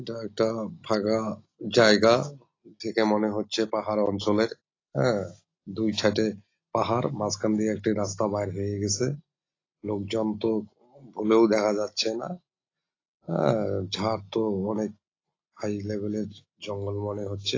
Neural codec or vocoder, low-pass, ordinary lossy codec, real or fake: none; 7.2 kHz; AAC, 32 kbps; real